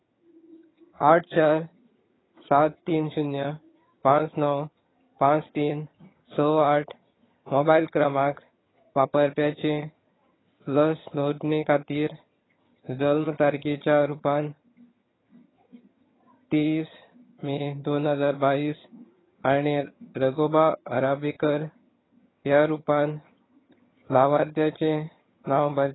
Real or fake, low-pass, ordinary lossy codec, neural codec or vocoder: fake; 7.2 kHz; AAC, 16 kbps; vocoder, 22.05 kHz, 80 mel bands, HiFi-GAN